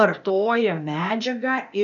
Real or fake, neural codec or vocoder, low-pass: fake; codec, 16 kHz, 0.8 kbps, ZipCodec; 7.2 kHz